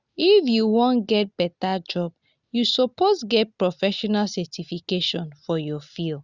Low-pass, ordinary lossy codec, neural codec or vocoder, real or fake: 7.2 kHz; Opus, 64 kbps; none; real